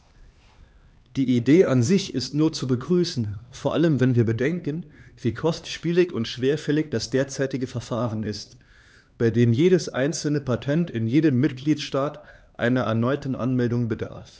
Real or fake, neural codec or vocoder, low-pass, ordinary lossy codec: fake; codec, 16 kHz, 2 kbps, X-Codec, HuBERT features, trained on LibriSpeech; none; none